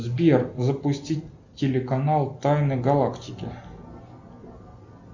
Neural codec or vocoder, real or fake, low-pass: none; real; 7.2 kHz